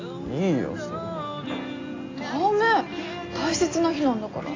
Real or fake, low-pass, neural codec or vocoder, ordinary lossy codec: real; 7.2 kHz; none; AAC, 32 kbps